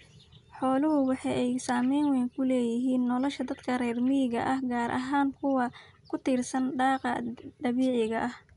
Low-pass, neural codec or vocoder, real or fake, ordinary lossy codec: 10.8 kHz; none; real; none